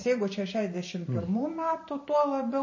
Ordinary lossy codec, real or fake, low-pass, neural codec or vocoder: MP3, 32 kbps; fake; 7.2 kHz; vocoder, 44.1 kHz, 128 mel bands every 512 samples, BigVGAN v2